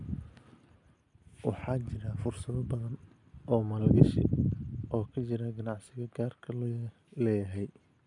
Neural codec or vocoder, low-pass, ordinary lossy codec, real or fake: none; 10.8 kHz; none; real